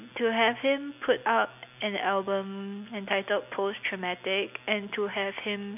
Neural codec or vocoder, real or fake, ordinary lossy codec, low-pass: none; real; none; 3.6 kHz